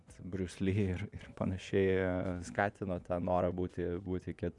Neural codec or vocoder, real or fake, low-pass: vocoder, 24 kHz, 100 mel bands, Vocos; fake; 10.8 kHz